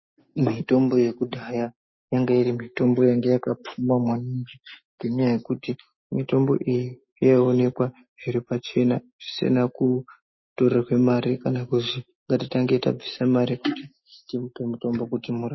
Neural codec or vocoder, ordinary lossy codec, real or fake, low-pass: none; MP3, 24 kbps; real; 7.2 kHz